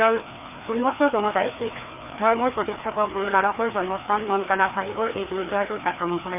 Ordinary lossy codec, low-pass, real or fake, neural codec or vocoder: none; 3.6 kHz; fake; codec, 16 kHz, 2 kbps, FreqCodec, larger model